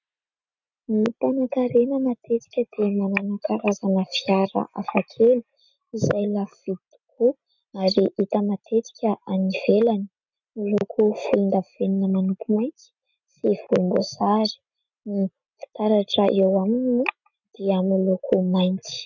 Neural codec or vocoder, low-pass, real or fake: none; 7.2 kHz; real